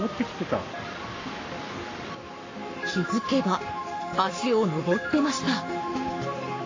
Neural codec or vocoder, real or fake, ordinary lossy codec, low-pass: codec, 44.1 kHz, 7.8 kbps, Pupu-Codec; fake; AAC, 32 kbps; 7.2 kHz